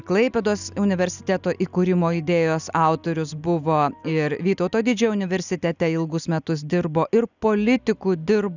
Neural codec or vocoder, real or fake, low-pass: none; real; 7.2 kHz